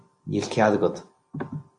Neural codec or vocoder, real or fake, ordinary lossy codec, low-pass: none; real; MP3, 48 kbps; 9.9 kHz